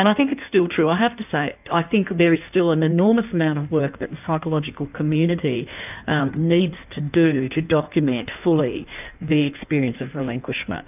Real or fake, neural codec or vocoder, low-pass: fake; codec, 16 kHz in and 24 kHz out, 1.1 kbps, FireRedTTS-2 codec; 3.6 kHz